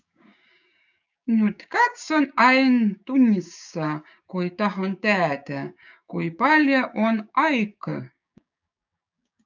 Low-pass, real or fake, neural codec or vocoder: 7.2 kHz; fake; codec, 16 kHz, 6 kbps, DAC